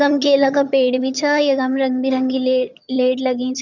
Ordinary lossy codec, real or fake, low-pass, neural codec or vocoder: none; fake; 7.2 kHz; vocoder, 22.05 kHz, 80 mel bands, HiFi-GAN